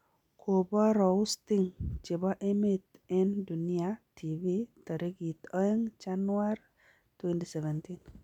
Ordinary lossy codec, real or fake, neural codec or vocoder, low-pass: none; real; none; 19.8 kHz